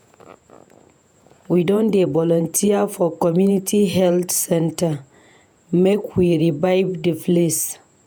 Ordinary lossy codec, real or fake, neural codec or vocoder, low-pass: none; fake; vocoder, 48 kHz, 128 mel bands, Vocos; none